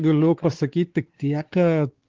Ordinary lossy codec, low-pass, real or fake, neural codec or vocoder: Opus, 16 kbps; 7.2 kHz; fake; codec, 16 kHz, 4 kbps, X-Codec, WavLM features, trained on Multilingual LibriSpeech